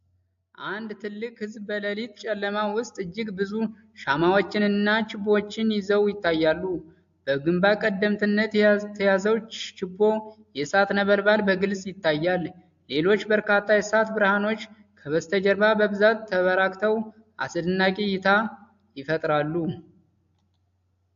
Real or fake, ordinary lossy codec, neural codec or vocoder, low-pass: real; AAC, 64 kbps; none; 7.2 kHz